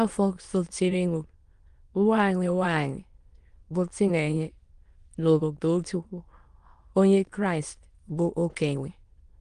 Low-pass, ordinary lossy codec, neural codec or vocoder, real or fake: 9.9 kHz; Opus, 24 kbps; autoencoder, 22.05 kHz, a latent of 192 numbers a frame, VITS, trained on many speakers; fake